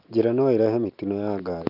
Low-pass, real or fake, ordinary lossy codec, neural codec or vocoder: 5.4 kHz; real; Opus, 24 kbps; none